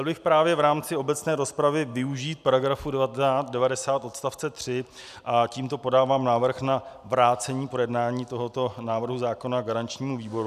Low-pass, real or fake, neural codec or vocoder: 14.4 kHz; real; none